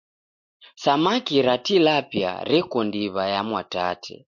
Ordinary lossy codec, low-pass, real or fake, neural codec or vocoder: MP3, 48 kbps; 7.2 kHz; real; none